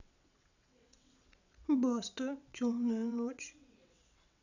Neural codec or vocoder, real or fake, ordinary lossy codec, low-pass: vocoder, 22.05 kHz, 80 mel bands, WaveNeXt; fake; none; 7.2 kHz